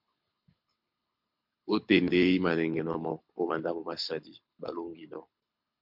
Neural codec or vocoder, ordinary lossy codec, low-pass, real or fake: codec, 24 kHz, 6 kbps, HILCodec; MP3, 48 kbps; 5.4 kHz; fake